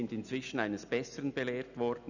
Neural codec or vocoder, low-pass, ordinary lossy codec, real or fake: none; 7.2 kHz; none; real